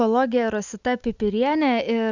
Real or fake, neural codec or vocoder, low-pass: real; none; 7.2 kHz